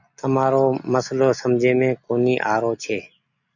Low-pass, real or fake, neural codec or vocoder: 7.2 kHz; real; none